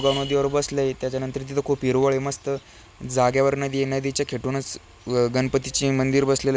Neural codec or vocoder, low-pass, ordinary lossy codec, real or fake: none; none; none; real